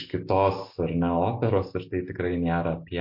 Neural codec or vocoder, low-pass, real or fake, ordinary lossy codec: none; 5.4 kHz; real; MP3, 32 kbps